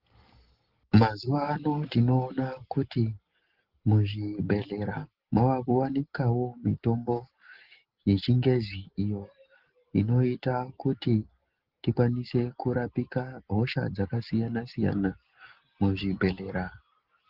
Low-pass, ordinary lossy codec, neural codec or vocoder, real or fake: 5.4 kHz; Opus, 16 kbps; none; real